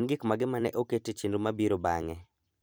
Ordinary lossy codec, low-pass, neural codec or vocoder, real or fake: none; none; vocoder, 44.1 kHz, 128 mel bands every 256 samples, BigVGAN v2; fake